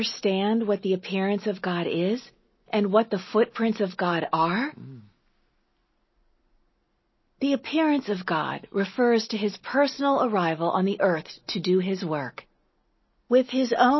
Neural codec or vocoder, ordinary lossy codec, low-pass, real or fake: none; MP3, 24 kbps; 7.2 kHz; real